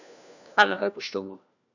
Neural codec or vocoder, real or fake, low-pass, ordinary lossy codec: codec, 16 kHz, 1 kbps, FunCodec, trained on LibriTTS, 50 frames a second; fake; 7.2 kHz; none